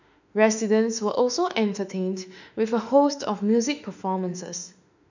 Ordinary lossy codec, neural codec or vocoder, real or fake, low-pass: none; autoencoder, 48 kHz, 32 numbers a frame, DAC-VAE, trained on Japanese speech; fake; 7.2 kHz